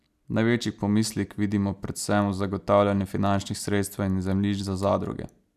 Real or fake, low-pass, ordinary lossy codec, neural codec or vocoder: real; 14.4 kHz; none; none